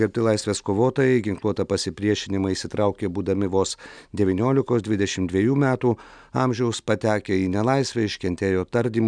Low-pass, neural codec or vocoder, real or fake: 9.9 kHz; none; real